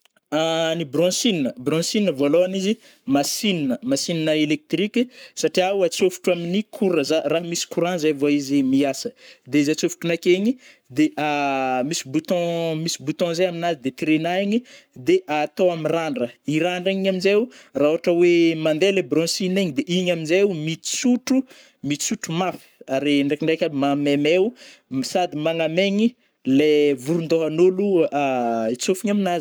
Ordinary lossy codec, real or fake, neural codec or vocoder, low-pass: none; fake; codec, 44.1 kHz, 7.8 kbps, Pupu-Codec; none